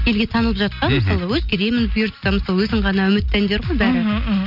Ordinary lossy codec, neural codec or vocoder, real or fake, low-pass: none; none; real; 5.4 kHz